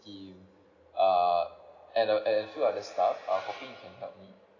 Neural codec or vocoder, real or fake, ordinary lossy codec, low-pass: none; real; none; 7.2 kHz